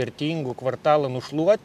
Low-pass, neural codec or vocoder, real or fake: 14.4 kHz; none; real